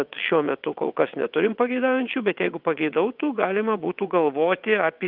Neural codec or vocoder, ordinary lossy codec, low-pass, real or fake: vocoder, 22.05 kHz, 80 mel bands, WaveNeXt; Opus, 24 kbps; 5.4 kHz; fake